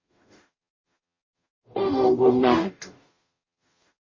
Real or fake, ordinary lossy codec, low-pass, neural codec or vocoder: fake; MP3, 32 kbps; 7.2 kHz; codec, 44.1 kHz, 0.9 kbps, DAC